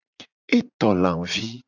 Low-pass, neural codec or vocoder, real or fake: 7.2 kHz; vocoder, 44.1 kHz, 80 mel bands, Vocos; fake